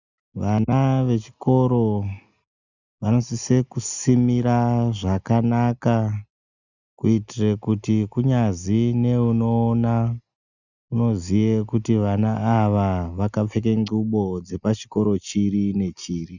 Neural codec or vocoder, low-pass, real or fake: none; 7.2 kHz; real